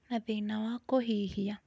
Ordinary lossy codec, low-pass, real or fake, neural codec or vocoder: none; none; real; none